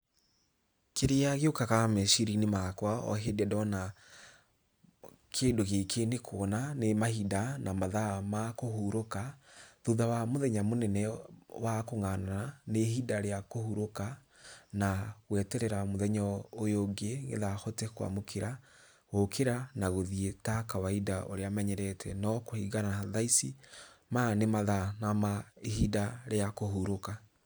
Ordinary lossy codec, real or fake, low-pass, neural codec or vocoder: none; real; none; none